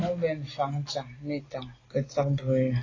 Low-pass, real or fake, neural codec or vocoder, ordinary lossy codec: 7.2 kHz; real; none; AAC, 32 kbps